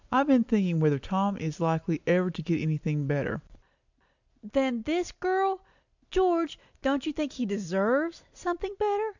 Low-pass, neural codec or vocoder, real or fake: 7.2 kHz; none; real